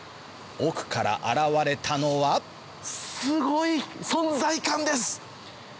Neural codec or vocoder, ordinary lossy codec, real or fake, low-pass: none; none; real; none